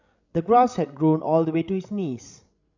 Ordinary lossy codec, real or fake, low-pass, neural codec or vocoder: none; fake; 7.2 kHz; codec, 16 kHz, 16 kbps, FreqCodec, larger model